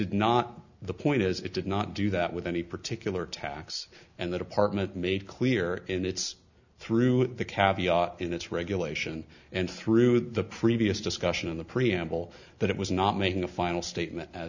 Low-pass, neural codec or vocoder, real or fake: 7.2 kHz; none; real